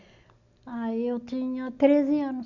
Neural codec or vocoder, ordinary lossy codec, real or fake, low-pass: none; none; real; 7.2 kHz